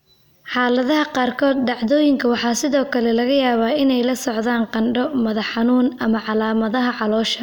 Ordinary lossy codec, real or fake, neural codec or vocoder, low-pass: none; real; none; 19.8 kHz